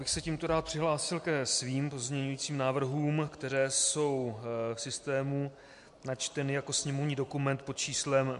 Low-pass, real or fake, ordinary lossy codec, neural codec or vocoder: 10.8 kHz; real; MP3, 64 kbps; none